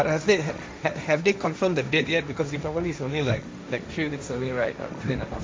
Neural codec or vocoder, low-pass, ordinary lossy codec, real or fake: codec, 16 kHz, 1.1 kbps, Voila-Tokenizer; none; none; fake